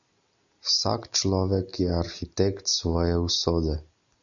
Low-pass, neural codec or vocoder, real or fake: 7.2 kHz; none; real